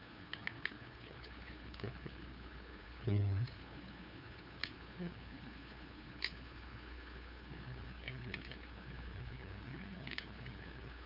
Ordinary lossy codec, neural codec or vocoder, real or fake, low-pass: none; codec, 16 kHz, 2 kbps, FunCodec, trained on LibriTTS, 25 frames a second; fake; 5.4 kHz